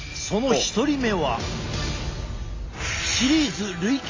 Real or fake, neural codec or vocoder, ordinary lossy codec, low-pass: real; none; AAC, 32 kbps; 7.2 kHz